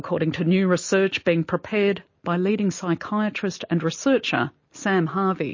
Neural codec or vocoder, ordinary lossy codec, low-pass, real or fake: none; MP3, 32 kbps; 7.2 kHz; real